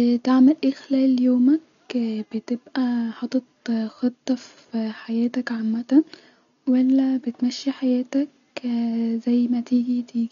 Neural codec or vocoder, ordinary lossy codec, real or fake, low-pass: none; none; real; 7.2 kHz